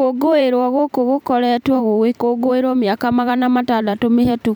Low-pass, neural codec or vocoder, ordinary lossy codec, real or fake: 19.8 kHz; vocoder, 44.1 kHz, 128 mel bands every 512 samples, BigVGAN v2; none; fake